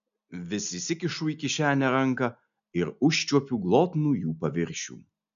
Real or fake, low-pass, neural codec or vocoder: real; 7.2 kHz; none